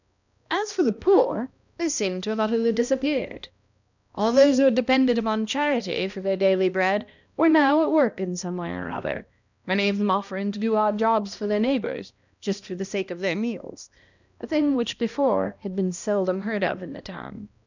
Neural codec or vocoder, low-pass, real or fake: codec, 16 kHz, 1 kbps, X-Codec, HuBERT features, trained on balanced general audio; 7.2 kHz; fake